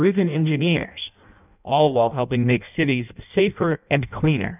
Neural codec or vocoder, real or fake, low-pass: codec, 16 kHz in and 24 kHz out, 0.6 kbps, FireRedTTS-2 codec; fake; 3.6 kHz